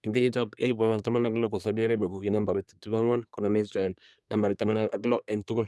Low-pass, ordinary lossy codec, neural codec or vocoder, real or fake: none; none; codec, 24 kHz, 1 kbps, SNAC; fake